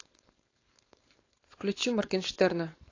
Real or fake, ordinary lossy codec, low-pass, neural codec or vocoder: fake; AAC, 32 kbps; 7.2 kHz; codec, 16 kHz, 4.8 kbps, FACodec